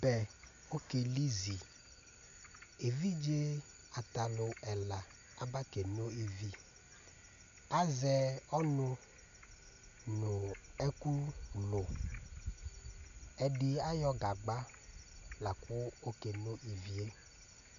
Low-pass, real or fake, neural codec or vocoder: 7.2 kHz; real; none